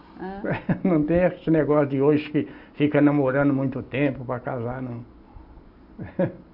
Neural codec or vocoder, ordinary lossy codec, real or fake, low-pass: none; Opus, 64 kbps; real; 5.4 kHz